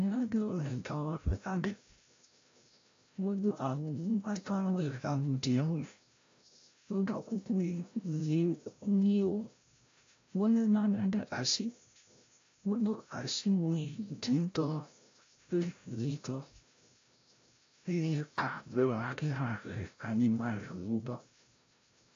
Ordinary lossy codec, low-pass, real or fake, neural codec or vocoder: AAC, 48 kbps; 7.2 kHz; fake; codec, 16 kHz, 0.5 kbps, FreqCodec, larger model